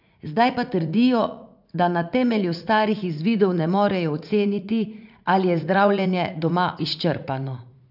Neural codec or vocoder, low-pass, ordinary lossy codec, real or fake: vocoder, 44.1 kHz, 80 mel bands, Vocos; 5.4 kHz; none; fake